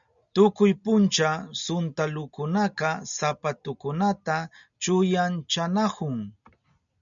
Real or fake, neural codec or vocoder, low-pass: real; none; 7.2 kHz